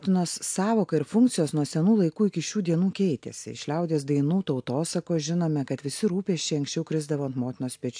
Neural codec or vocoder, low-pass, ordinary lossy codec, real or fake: none; 9.9 kHz; AAC, 64 kbps; real